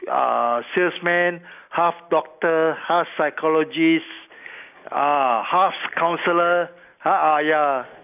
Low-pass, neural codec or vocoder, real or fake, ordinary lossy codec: 3.6 kHz; none; real; none